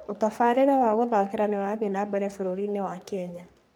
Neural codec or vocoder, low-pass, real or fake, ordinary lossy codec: codec, 44.1 kHz, 3.4 kbps, Pupu-Codec; none; fake; none